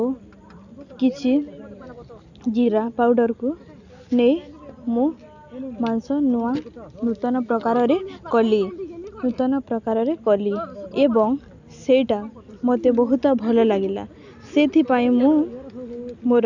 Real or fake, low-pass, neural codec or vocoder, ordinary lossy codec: real; 7.2 kHz; none; none